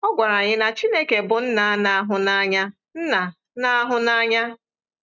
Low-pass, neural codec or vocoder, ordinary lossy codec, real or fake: 7.2 kHz; none; none; real